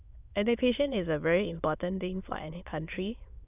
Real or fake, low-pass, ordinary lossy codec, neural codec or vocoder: fake; 3.6 kHz; none; autoencoder, 22.05 kHz, a latent of 192 numbers a frame, VITS, trained on many speakers